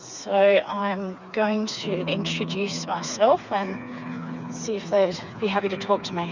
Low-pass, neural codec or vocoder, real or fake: 7.2 kHz; codec, 16 kHz, 4 kbps, FreqCodec, smaller model; fake